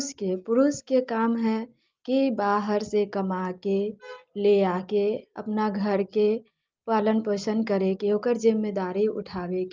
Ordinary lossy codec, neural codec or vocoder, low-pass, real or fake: Opus, 32 kbps; none; 7.2 kHz; real